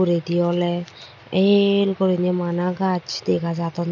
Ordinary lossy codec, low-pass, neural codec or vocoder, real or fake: none; 7.2 kHz; none; real